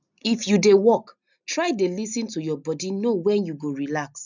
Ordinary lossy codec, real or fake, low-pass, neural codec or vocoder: none; real; 7.2 kHz; none